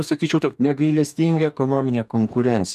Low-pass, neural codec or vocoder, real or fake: 14.4 kHz; codec, 44.1 kHz, 2.6 kbps, DAC; fake